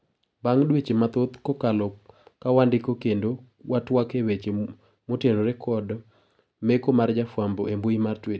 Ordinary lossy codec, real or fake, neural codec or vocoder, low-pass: none; real; none; none